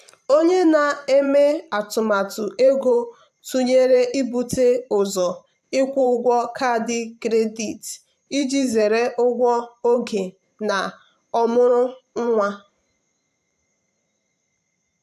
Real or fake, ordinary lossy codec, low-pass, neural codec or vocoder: fake; AAC, 96 kbps; 14.4 kHz; vocoder, 44.1 kHz, 128 mel bands every 512 samples, BigVGAN v2